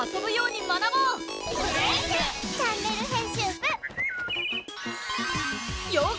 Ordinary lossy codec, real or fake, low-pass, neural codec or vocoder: none; real; none; none